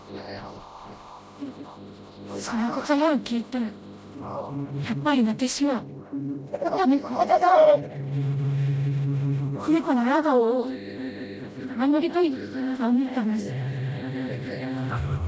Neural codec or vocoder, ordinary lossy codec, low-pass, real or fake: codec, 16 kHz, 0.5 kbps, FreqCodec, smaller model; none; none; fake